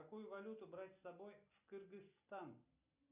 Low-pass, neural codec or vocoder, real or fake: 3.6 kHz; none; real